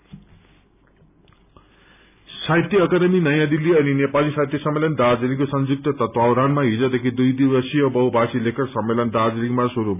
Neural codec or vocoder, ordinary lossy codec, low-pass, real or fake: none; none; 3.6 kHz; real